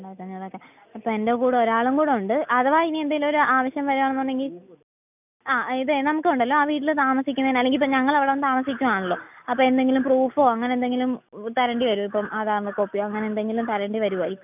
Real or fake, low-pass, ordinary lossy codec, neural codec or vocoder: real; 3.6 kHz; none; none